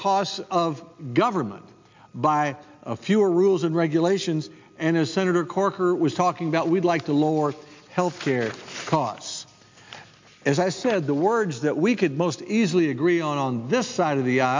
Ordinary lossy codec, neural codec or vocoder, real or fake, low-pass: MP3, 64 kbps; none; real; 7.2 kHz